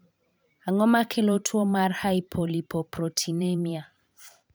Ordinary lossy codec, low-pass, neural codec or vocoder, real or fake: none; none; vocoder, 44.1 kHz, 128 mel bands every 512 samples, BigVGAN v2; fake